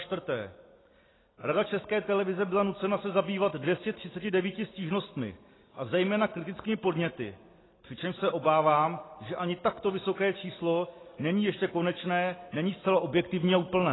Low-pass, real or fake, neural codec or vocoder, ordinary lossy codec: 7.2 kHz; real; none; AAC, 16 kbps